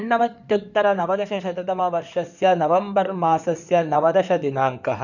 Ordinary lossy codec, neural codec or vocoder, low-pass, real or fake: none; codec, 16 kHz in and 24 kHz out, 2.2 kbps, FireRedTTS-2 codec; 7.2 kHz; fake